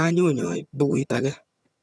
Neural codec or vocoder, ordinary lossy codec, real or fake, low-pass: vocoder, 22.05 kHz, 80 mel bands, HiFi-GAN; none; fake; none